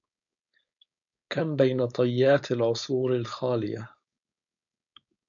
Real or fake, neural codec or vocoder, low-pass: fake; codec, 16 kHz, 4.8 kbps, FACodec; 7.2 kHz